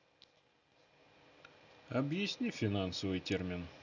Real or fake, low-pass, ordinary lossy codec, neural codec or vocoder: real; 7.2 kHz; none; none